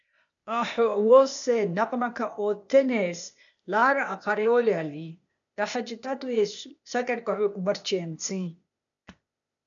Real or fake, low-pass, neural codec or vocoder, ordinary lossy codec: fake; 7.2 kHz; codec, 16 kHz, 0.8 kbps, ZipCodec; MP3, 96 kbps